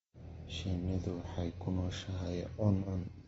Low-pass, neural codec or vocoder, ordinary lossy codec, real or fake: 19.8 kHz; none; AAC, 24 kbps; real